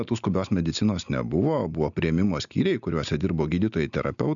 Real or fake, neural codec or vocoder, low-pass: real; none; 7.2 kHz